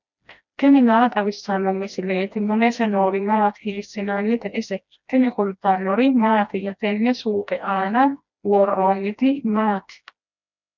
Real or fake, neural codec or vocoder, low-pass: fake; codec, 16 kHz, 1 kbps, FreqCodec, smaller model; 7.2 kHz